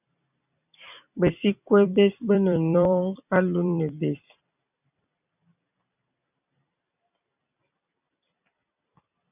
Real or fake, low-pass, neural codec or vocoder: fake; 3.6 kHz; vocoder, 44.1 kHz, 128 mel bands every 256 samples, BigVGAN v2